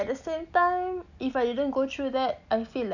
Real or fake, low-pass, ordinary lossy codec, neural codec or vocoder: real; 7.2 kHz; none; none